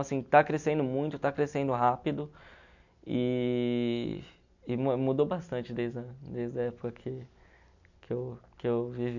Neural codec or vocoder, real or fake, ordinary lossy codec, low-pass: none; real; none; 7.2 kHz